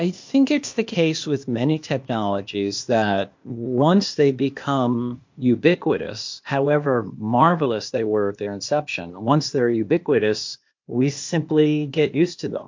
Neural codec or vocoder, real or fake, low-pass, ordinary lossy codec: codec, 16 kHz, 0.8 kbps, ZipCodec; fake; 7.2 kHz; MP3, 48 kbps